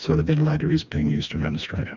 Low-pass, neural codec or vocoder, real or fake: 7.2 kHz; codec, 16 kHz, 2 kbps, FreqCodec, smaller model; fake